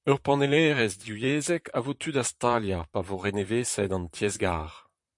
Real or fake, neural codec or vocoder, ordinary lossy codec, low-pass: fake; vocoder, 44.1 kHz, 128 mel bands, Pupu-Vocoder; MP3, 64 kbps; 10.8 kHz